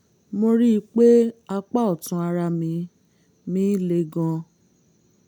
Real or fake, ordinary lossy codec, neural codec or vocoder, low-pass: real; none; none; 19.8 kHz